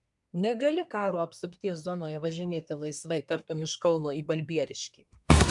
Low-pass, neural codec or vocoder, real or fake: 10.8 kHz; codec, 24 kHz, 1 kbps, SNAC; fake